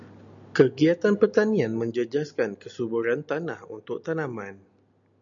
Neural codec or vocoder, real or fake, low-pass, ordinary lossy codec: none; real; 7.2 kHz; MP3, 96 kbps